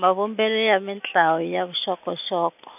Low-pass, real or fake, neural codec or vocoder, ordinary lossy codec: 3.6 kHz; real; none; none